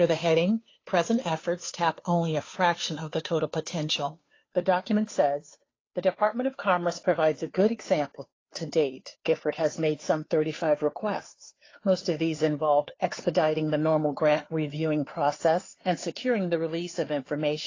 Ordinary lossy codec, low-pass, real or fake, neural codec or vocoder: AAC, 32 kbps; 7.2 kHz; fake; codec, 16 kHz, 2 kbps, FunCodec, trained on Chinese and English, 25 frames a second